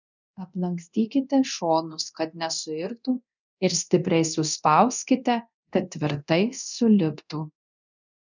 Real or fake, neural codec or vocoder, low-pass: fake; codec, 24 kHz, 0.9 kbps, DualCodec; 7.2 kHz